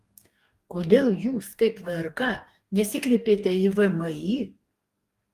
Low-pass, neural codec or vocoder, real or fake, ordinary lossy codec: 14.4 kHz; codec, 44.1 kHz, 2.6 kbps, DAC; fake; Opus, 32 kbps